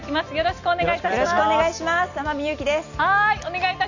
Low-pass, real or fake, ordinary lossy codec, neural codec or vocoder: 7.2 kHz; real; none; none